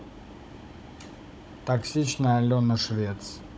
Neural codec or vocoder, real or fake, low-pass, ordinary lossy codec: codec, 16 kHz, 16 kbps, FunCodec, trained on Chinese and English, 50 frames a second; fake; none; none